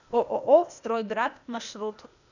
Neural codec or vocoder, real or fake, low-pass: codec, 16 kHz, 0.8 kbps, ZipCodec; fake; 7.2 kHz